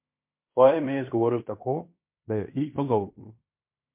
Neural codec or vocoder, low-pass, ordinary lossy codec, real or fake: codec, 16 kHz in and 24 kHz out, 0.9 kbps, LongCat-Audio-Codec, fine tuned four codebook decoder; 3.6 kHz; MP3, 32 kbps; fake